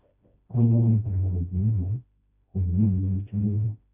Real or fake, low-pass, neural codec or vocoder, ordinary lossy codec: fake; 3.6 kHz; codec, 16 kHz, 1 kbps, FreqCodec, smaller model; none